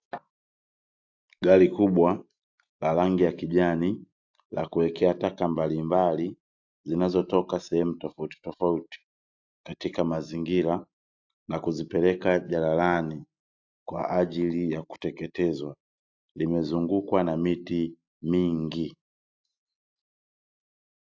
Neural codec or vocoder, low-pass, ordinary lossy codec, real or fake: none; 7.2 kHz; AAC, 48 kbps; real